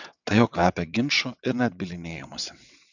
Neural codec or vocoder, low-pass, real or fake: vocoder, 22.05 kHz, 80 mel bands, WaveNeXt; 7.2 kHz; fake